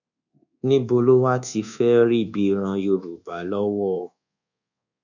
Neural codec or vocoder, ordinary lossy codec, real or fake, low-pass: codec, 24 kHz, 1.2 kbps, DualCodec; none; fake; 7.2 kHz